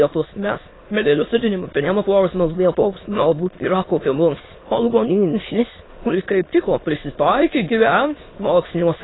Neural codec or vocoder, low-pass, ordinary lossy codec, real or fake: autoencoder, 22.05 kHz, a latent of 192 numbers a frame, VITS, trained on many speakers; 7.2 kHz; AAC, 16 kbps; fake